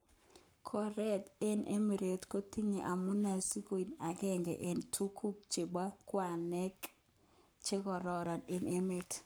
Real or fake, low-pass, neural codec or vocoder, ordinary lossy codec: fake; none; codec, 44.1 kHz, 7.8 kbps, Pupu-Codec; none